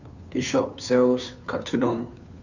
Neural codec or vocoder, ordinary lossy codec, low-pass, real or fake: codec, 16 kHz, 2 kbps, FunCodec, trained on Chinese and English, 25 frames a second; none; 7.2 kHz; fake